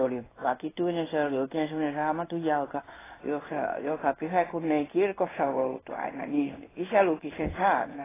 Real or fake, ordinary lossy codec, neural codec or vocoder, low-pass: fake; AAC, 16 kbps; codec, 16 kHz in and 24 kHz out, 1 kbps, XY-Tokenizer; 3.6 kHz